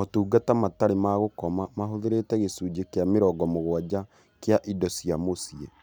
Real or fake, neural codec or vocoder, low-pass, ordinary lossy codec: real; none; none; none